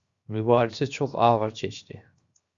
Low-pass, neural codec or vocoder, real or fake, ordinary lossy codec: 7.2 kHz; codec, 16 kHz, 0.7 kbps, FocalCodec; fake; Opus, 64 kbps